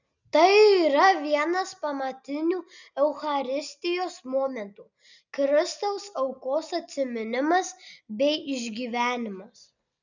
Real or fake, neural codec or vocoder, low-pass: real; none; 7.2 kHz